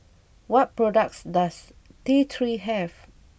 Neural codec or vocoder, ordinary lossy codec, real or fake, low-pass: none; none; real; none